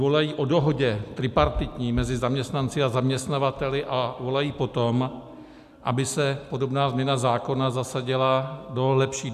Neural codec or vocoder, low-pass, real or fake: none; 14.4 kHz; real